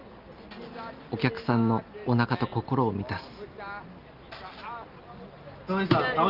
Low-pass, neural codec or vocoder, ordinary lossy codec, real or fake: 5.4 kHz; none; Opus, 32 kbps; real